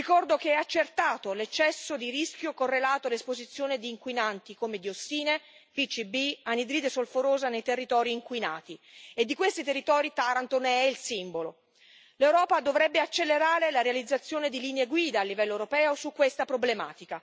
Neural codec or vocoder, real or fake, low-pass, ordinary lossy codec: none; real; none; none